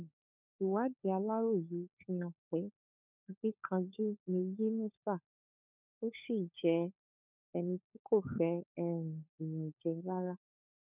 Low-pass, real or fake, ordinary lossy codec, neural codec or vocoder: 3.6 kHz; fake; none; codec, 16 kHz, 4 kbps, FunCodec, trained on LibriTTS, 50 frames a second